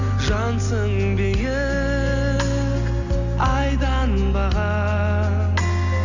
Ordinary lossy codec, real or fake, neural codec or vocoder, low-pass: none; real; none; 7.2 kHz